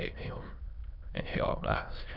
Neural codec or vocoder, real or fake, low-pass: autoencoder, 22.05 kHz, a latent of 192 numbers a frame, VITS, trained on many speakers; fake; 5.4 kHz